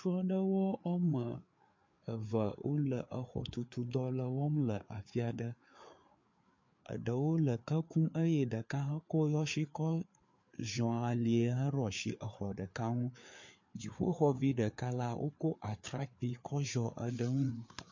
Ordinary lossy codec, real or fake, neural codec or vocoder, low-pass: MP3, 48 kbps; fake; codec, 16 kHz, 4 kbps, FreqCodec, larger model; 7.2 kHz